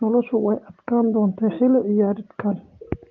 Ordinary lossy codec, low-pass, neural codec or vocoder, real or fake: Opus, 32 kbps; 7.2 kHz; none; real